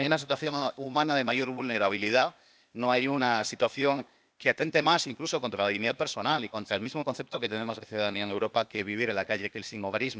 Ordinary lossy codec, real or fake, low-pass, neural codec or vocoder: none; fake; none; codec, 16 kHz, 0.8 kbps, ZipCodec